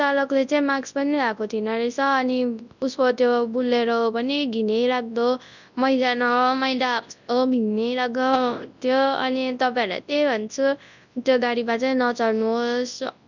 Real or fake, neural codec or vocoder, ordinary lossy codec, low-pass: fake; codec, 24 kHz, 0.9 kbps, WavTokenizer, large speech release; none; 7.2 kHz